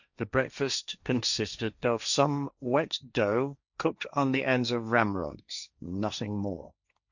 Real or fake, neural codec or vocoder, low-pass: fake; codec, 16 kHz, 1.1 kbps, Voila-Tokenizer; 7.2 kHz